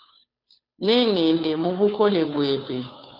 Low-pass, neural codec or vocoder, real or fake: 5.4 kHz; codec, 16 kHz, 2 kbps, FunCodec, trained on Chinese and English, 25 frames a second; fake